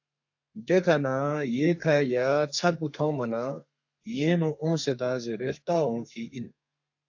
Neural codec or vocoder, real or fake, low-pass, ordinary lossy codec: codec, 32 kHz, 1.9 kbps, SNAC; fake; 7.2 kHz; AAC, 48 kbps